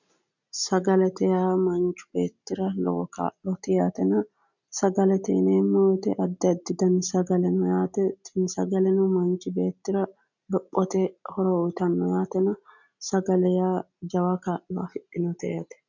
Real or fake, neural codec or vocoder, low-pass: real; none; 7.2 kHz